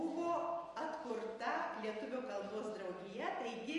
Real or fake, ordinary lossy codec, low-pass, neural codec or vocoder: real; MP3, 48 kbps; 14.4 kHz; none